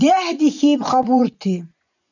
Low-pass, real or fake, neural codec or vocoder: 7.2 kHz; fake; vocoder, 24 kHz, 100 mel bands, Vocos